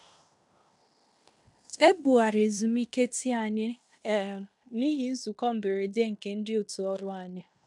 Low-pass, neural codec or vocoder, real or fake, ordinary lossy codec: 10.8 kHz; codec, 16 kHz in and 24 kHz out, 0.9 kbps, LongCat-Audio-Codec, fine tuned four codebook decoder; fake; none